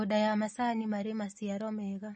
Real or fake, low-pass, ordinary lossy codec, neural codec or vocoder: real; 10.8 kHz; MP3, 32 kbps; none